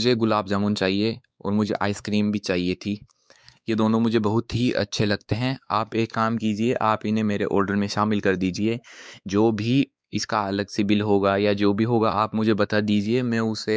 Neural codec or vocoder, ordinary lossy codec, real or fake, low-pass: codec, 16 kHz, 4 kbps, X-Codec, WavLM features, trained on Multilingual LibriSpeech; none; fake; none